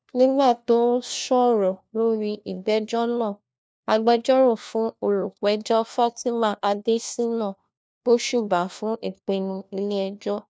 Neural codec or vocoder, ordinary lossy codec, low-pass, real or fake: codec, 16 kHz, 1 kbps, FunCodec, trained on LibriTTS, 50 frames a second; none; none; fake